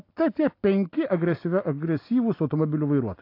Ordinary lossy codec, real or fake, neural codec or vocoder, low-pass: AAC, 32 kbps; real; none; 5.4 kHz